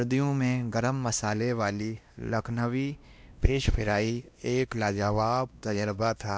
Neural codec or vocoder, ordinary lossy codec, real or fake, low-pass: codec, 16 kHz, 1 kbps, X-Codec, WavLM features, trained on Multilingual LibriSpeech; none; fake; none